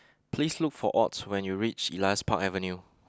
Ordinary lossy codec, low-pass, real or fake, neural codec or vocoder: none; none; real; none